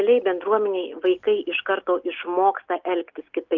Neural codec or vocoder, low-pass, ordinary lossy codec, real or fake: none; 7.2 kHz; Opus, 32 kbps; real